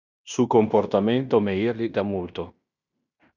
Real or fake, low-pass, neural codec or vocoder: fake; 7.2 kHz; codec, 16 kHz in and 24 kHz out, 0.9 kbps, LongCat-Audio-Codec, fine tuned four codebook decoder